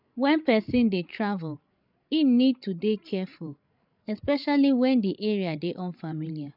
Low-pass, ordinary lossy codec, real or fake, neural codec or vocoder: 5.4 kHz; none; fake; codec, 16 kHz, 16 kbps, FreqCodec, larger model